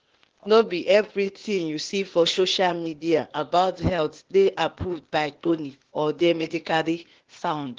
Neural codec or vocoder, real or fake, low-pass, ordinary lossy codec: codec, 16 kHz, 0.8 kbps, ZipCodec; fake; 7.2 kHz; Opus, 16 kbps